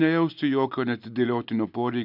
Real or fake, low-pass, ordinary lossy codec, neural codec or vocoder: real; 5.4 kHz; AAC, 48 kbps; none